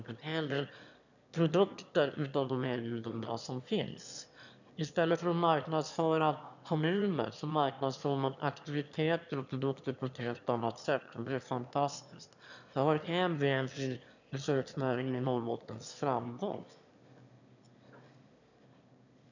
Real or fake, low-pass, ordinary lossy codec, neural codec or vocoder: fake; 7.2 kHz; none; autoencoder, 22.05 kHz, a latent of 192 numbers a frame, VITS, trained on one speaker